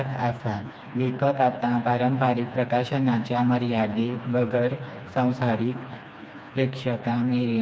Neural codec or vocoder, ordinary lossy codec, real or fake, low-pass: codec, 16 kHz, 2 kbps, FreqCodec, smaller model; none; fake; none